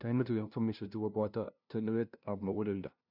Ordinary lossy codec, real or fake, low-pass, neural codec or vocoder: none; fake; 5.4 kHz; codec, 16 kHz, 0.5 kbps, FunCodec, trained on LibriTTS, 25 frames a second